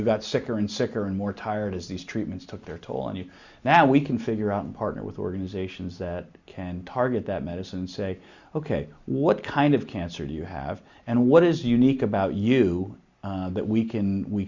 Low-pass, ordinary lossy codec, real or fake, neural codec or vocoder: 7.2 kHz; Opus, 64 kbps; real; none